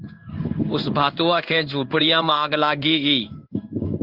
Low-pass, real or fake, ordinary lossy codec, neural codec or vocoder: 5.4 kHz; fake; Opus, 32 kbps; codec, 16 kHz in and 24 kHz out, 1 kbps, XY-Tokenizer